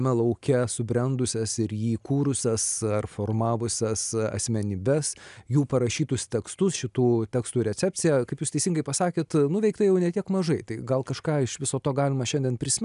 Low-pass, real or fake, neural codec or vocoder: 10.8 kHz; real; none